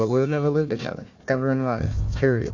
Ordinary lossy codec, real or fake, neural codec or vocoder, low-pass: AAC, 48 kbps; fake; codec, 16 kHz, 1 kbps, FunCodec, trained on Chinese and English, 50 frames a second; 7.2 kHz